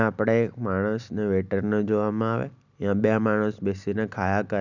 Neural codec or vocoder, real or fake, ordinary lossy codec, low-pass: none; real; none; 7.2 kHz